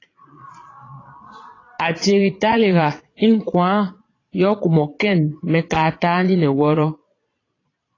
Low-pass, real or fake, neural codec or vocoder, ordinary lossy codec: 7.2 kHz; fake; vocoder, 22.05 kHz, 80 mel bands, Vocos; AAC, 32 kbps